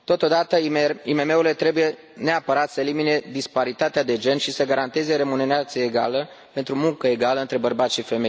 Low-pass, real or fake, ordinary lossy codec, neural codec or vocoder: none; real; none; none